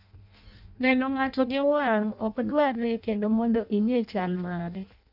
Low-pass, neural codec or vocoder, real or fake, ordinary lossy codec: 5.4 kHz; codec, 16 kHz in and 24 kHz out, 0.6 kbps, FireRedTTS-2 codec; fake; none